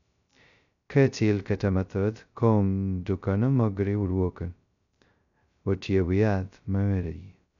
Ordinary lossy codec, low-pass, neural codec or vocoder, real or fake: none; 7.2 kHz; codec, 16 kHz, 0.2 kbps, FocalCodec; fake